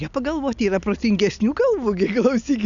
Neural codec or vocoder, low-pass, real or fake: none; 7.2 kHz; real